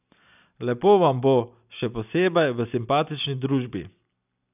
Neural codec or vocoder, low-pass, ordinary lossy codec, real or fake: none; 3.6 kHz; none; real